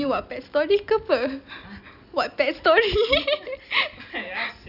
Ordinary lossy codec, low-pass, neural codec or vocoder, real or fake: none; 5.4 kHz; none; real